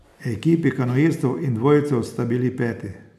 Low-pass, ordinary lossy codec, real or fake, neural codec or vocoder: 14.4 kHz; none; real; none